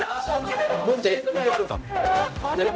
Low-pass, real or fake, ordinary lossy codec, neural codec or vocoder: none; fake; none; codec, 16 kHz, 0.5 kbps, X-Codec, HuBERT features, trained on general audio